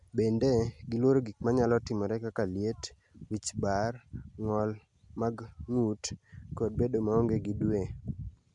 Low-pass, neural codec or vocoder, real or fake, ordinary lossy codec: 10.8 kHz; none; real; none